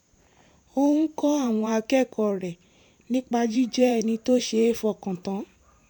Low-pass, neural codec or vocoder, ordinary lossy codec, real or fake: 19.8 kHz; vocoder, 44.1 kHz, 128 mel bands every 512 samples, BigVGAN v2; none; fake